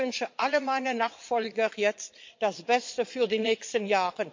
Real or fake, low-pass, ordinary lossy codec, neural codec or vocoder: fake; 7.2 kHz; MP3, 48 kbps; vocoder, 22.05 kHz, 80 mel bands, Vocos